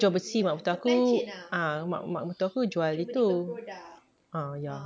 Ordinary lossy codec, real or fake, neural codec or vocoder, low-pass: none; real; none; none